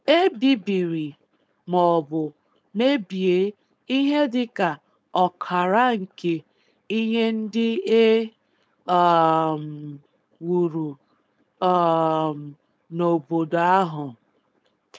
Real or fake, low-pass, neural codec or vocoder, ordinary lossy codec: fake; none; codec, 16 kHz, 4.8 kbps, FACodec; none